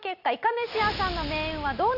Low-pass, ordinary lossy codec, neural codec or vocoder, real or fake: 5.4 kHz; none; none; real